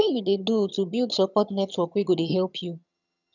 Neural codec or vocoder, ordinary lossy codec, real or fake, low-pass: vocoder, 22.05 kHz, 80 mel bands, HiFi-GAN; none; fake; 7.2 kHz